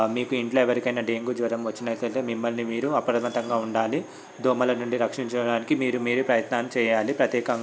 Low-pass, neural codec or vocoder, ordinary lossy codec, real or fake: none; none; none; real